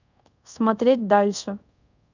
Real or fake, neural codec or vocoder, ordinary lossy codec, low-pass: fake; codec, 24 kHz, 0.5 kbps, DualCodec; none; 7.2 kHz